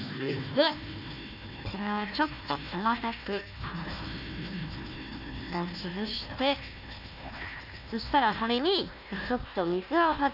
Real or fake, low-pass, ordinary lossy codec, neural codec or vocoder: fake; 5.4 kHz; none; codec, 16 kHz, 1 kbps, FunCodec, trained on Chinese and English, 50 frames a second